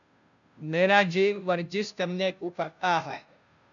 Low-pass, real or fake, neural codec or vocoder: 7.2 kHz; fake; codec, 16 kHz, 0.5 kbps, FunCodec, trained on Chinese and English, 25 frames a second